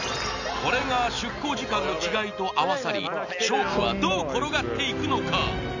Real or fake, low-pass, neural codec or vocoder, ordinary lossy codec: real; 7.2 kHz; none; none